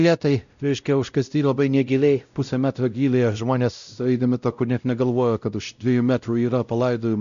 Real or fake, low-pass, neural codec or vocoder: fake; 7.2 kHz; codec, 16 kHz, 0.5 kbps, X-Codec, WavLM features, trained on Multilingual LibriSpeech